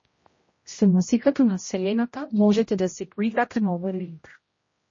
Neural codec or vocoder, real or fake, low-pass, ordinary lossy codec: codec, 16 kHz, 0.5 kbps, X-Codec, HuBERT features, trained on general audio; fake; 7.2 kHz; MP3, 32 kbps